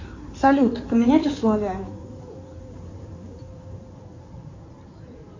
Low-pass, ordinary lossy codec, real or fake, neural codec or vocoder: 7.2 kHz; MP3, 48 kbps; fake; codec, 16 kHz in and 24 kHz out, 2.2 kbps, FireRedTTS-2 codec